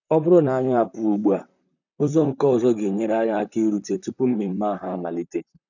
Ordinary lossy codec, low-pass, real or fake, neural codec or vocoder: none; 7.2 kHz; fake; vocoder, 44.1 kHz, 128 mel bands, Pupu-Vocoder